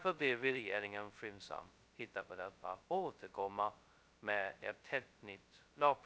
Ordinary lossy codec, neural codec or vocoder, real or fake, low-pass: none; codec, 16 kHz, 0.2 kbps, FocalCodec; fake; none